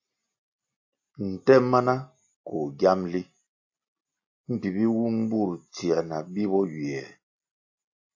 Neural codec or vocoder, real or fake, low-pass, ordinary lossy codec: none; real; 7.2 kHz; AAC, 48 kbps